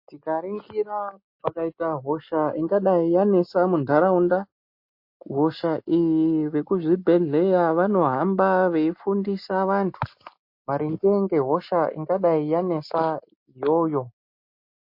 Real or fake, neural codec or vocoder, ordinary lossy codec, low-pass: real; none; MP3, 32 kbps; 5.4 kHz